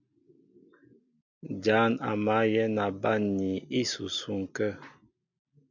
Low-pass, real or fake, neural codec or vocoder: 7.2 kHz; real; none